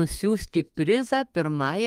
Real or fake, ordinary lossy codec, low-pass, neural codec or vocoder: fake; Opus, 32 kbps; 14.4 kHz; codec, 32 kHz, 1.9 kbps, SNAC